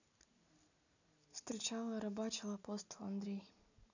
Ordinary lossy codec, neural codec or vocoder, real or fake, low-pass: none; none; real; 7.2 kHz